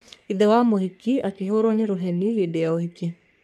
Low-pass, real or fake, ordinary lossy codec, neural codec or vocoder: 14.4 kHz; fake; none; codec, 44.1 kHz, 3.4 kbps, Pupu-Codec